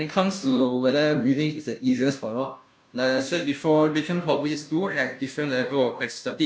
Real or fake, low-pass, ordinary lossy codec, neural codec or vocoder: fake; none; none; codec, 16 kHz, 0.5 kbps, FunCodec, trained on Chinese and English, 25 frames a second